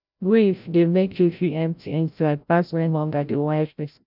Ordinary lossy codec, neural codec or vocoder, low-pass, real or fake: none; codec, 16 kHz, 0.5 kbps, FreqCodec, larger model; 5.4 kHz; fake